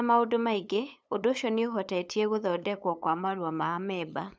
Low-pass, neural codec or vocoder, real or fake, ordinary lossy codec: none; codec, 16 kHz, 8 kbps, FunCodec, trained on LibriTTS, 25 frames a second; fake; none